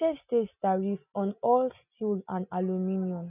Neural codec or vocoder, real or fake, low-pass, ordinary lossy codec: none; real; 3.6 kHz; none